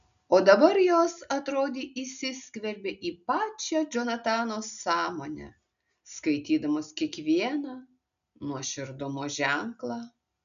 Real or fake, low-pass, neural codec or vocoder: real; 7.2 kHz; none